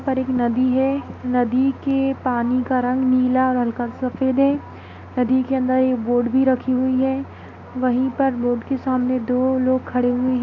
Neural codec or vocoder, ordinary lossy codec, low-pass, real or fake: none; none; 7.2 kHz; real